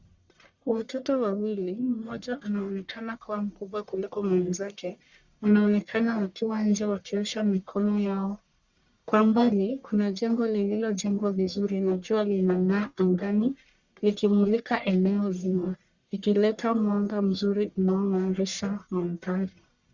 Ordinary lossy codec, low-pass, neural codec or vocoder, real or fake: Opus, 64 kbps; 7.2 kHz; codec, 44.1 kHz, 1.7 kbps, Pupu-Codec; fake